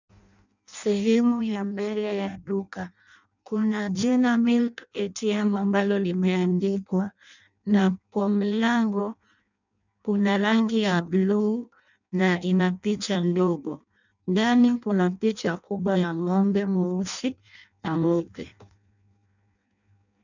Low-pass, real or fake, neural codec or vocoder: 7.2 kHz; fake; codec, 16 kHz in and 24 kHz out, 0.6 kbps, FireRedTTS-2 codec